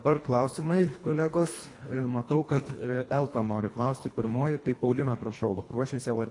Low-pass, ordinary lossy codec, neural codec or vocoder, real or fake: 10.8 kHz; AAC, 48 kbps; codec, 24 kHz, 1.5 kbps, HILCodec; fake